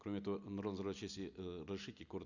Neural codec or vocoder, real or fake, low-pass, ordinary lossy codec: none; real; 7.2 kHz; none